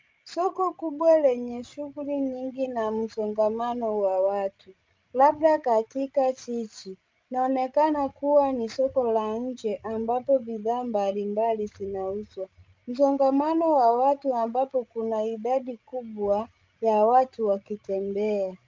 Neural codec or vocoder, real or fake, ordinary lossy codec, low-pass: codec, 16 kHz, 16 kbps, FreqCodec, larger model; fake; Opus, 24 kbps; 7.2 kHz